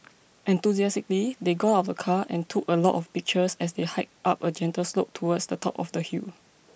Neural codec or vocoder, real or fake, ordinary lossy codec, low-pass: none; real; none; none